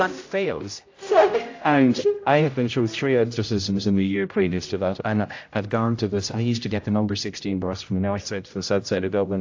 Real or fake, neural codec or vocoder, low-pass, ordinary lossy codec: fake; codec, 16 kHz, 0.5 kbps, X-Codec, HuBERT features, trained on general audio; 7.2 kHz; AAC, 48 kbps